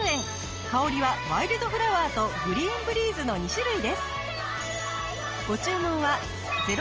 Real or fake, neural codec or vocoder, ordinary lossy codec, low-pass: real; none; Opus, 24 kbps; 7.2 kHz